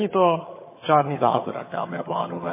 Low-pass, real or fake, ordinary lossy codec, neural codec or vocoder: 3.6 kHz; fake; MP3, 16 kbps; vocoder, 22.05 kHz, 80 mel bands, HiFi-GAN